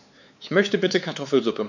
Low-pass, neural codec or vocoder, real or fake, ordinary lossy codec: 7.2 kHz; codec, 16 kHz, 4 kbps, X-Codec, WavLM features, trained on Multilingual LibriSpeech; fake; none